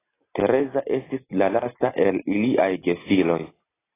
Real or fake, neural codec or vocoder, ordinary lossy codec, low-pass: real; none; AAC, 16 kbps; 3.6 kHz